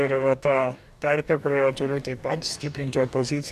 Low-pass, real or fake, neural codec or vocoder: 14.4 kHz; fake; codec, 44.1 kHz, 2.6 kbps, DAC